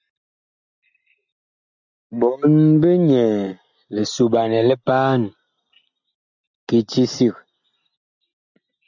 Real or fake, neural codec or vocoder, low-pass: real; none; 7.2 kHz